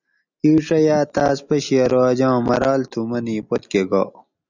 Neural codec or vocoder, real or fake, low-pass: none; real; 7.2 kHz